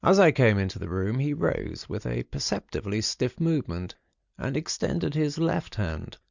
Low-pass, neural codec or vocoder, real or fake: 7.2 kHz; none; real